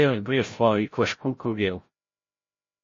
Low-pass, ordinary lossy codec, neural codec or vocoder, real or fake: 7.2 kHz; MP3, 32 kbps; codec, 16 kHz, 0.5 kbps, FreqCodec, larger model; fake